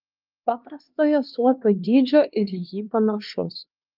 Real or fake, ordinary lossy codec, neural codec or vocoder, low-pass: fake; Opus, 24 kbps; codec, 16 kHz, 2 kbps, X-Codec, HuBERT features, trained on LibriSpeech; 5.4 kHz